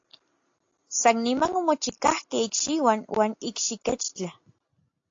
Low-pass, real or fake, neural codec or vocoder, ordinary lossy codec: 7.2 kHz; real; none; AAC, 48 kbps